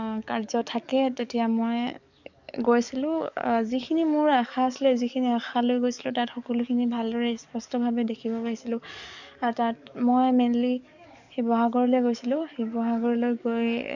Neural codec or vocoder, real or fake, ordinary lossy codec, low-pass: codec, 44.1 kHz, 7.8 kbps, DAC; fake; none; 7.2 kHz